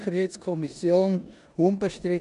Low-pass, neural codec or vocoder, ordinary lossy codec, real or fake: 10.8 kHz; codec, 16 kHz in and 24 kHz out, 0.9 kbps, LongCat-Audio-Codec, four codebook decoder; none; fake